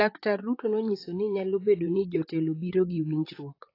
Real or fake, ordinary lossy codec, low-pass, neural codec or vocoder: fake; AAC, 24 kbps; 5.4 kHz; vocoder, 44.1 kHz, 128 mel bands, Pupu-Vocoder